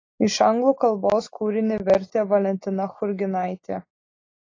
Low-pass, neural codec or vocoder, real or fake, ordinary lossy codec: 7.2 kHz; none; real; AAC, 32 kbps